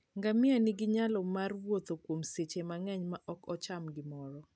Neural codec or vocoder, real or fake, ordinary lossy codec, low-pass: none; real; none; none